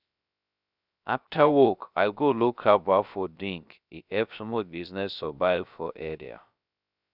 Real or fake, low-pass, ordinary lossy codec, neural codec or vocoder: fake; 5.4 kHz; none; codec, 16 kHz, 0.3 kbps, FocalCodec